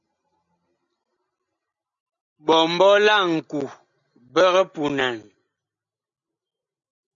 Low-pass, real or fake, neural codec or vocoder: 7.2 kHz; real; none